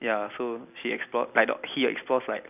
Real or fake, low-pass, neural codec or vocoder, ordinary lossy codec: real; 3.6 kHz; none; none